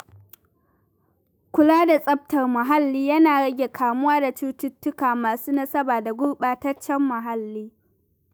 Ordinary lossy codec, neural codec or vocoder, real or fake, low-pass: none; autoencoder, 48 kHz, 128 numbers a frame, DAC-VAE, trained on Japanese speech; fake; none